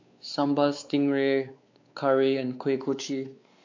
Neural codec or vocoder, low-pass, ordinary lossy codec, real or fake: codec, 16 kHz, 4 kbps, X-Codec, WavLM features, trained on Multilingual LibriSpeech; 7.2 kHz; AAC, 48 kbps; fake